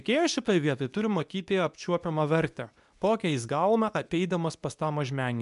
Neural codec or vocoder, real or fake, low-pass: codec, 24 kHz, 0.9 kbps, WavTokenizer, medium speech release version 2; fake; 10.8 kHz